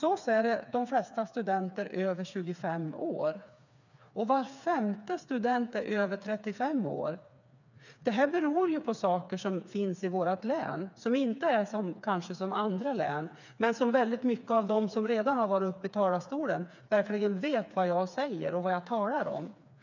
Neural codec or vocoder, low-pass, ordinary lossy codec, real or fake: codec, 16 kHz, 4 kbps, FreqCodec, smaller model; 7.2 kHz; none; fake